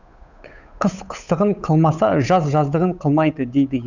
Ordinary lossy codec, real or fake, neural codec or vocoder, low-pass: none; fake; codec, 16 kHz, 8 kbps, FunCodec, trained on Chinese and English, 25 frames a second; 7.2 kHz